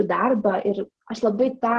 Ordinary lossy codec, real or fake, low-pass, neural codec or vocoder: Opus, 16 kbps; real; 10.8 kHz; none